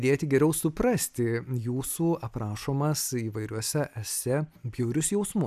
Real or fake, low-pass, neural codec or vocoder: real; 14.4 kHz; none